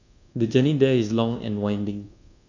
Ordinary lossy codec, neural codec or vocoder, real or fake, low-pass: none; codec, 24 kHz, 1.2 kbps, DualCodec; fake; 7.2 kHz